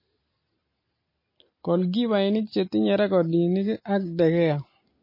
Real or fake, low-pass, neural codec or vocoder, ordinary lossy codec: real; 5.4 kHz; none; MP3, 24 kbps